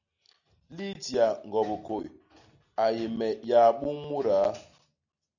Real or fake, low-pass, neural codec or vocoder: real; 7.2 kHz; none